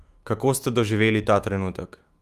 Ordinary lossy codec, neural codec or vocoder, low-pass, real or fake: Opus, 32 kbps; autoencoder, 48 kHz, 128 numbers a frame, DAC-VAE, trained on Japanese speech; 14.4 kHz; fake